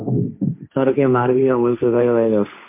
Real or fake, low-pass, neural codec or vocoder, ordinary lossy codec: fake; 3.6 kHz; codec, 16 kHz, 1.1 kbps, Voila-Tokenizer; MP3, 24 kbps